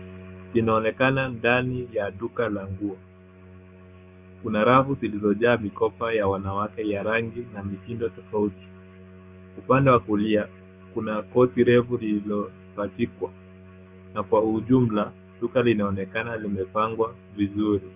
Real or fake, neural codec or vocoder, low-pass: fake; codec, 16 kHz, 6 kbps, DAC; 3.6 kHz